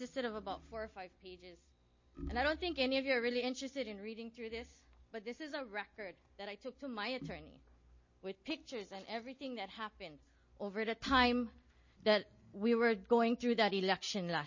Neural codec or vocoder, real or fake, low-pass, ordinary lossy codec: none; real; 7.2 kHz; MP3, 32 kbps